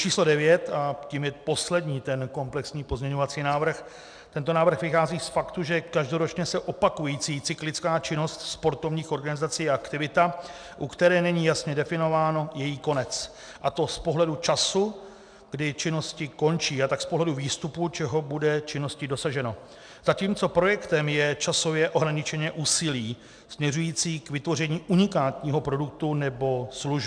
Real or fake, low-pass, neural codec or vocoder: real; 9.9 kHz; none